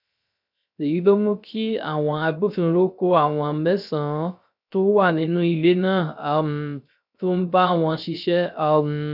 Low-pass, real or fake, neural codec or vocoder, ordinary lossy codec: 5.4 kHz; fake; codec, 16 kHz, 0.3 kbps, FocalCodec; none